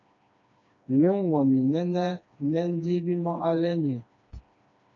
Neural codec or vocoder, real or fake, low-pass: codec, 16 kHz, 2 kbps, FreqCodec, smaller model; fake; 7.2 kHz